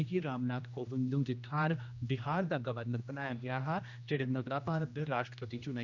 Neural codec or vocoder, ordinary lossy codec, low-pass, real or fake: codec, 16 kHz, 1 kbps, X-Codec, HuBERT features, trained on general audio; none; 7.2 kHz; fake